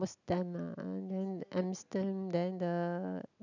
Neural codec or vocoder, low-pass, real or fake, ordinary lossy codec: none; 7.2 kHz; real; none